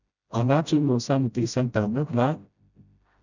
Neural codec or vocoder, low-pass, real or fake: codec, 16 kHz, 0.5 kbps, FreqCodec, smaller model; 7.2 kHz; fake